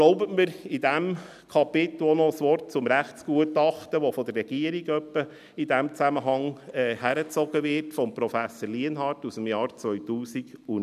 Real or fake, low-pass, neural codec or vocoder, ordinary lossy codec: real; 14.4 kHz; none; none